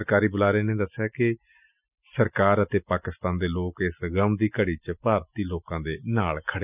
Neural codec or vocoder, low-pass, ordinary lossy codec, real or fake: none; 3.6 kHz; none; real